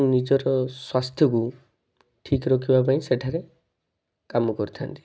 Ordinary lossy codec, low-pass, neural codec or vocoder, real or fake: none; none; none; real